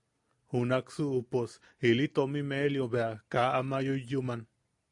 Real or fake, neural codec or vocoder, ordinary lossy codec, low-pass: real; none; AAC, 64 kbps; 10.8 kHz